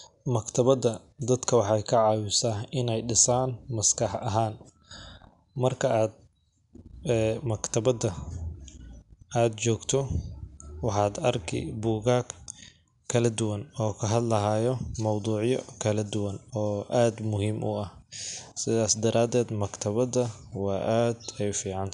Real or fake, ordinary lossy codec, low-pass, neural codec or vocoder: real; none; 10.8 kHz; none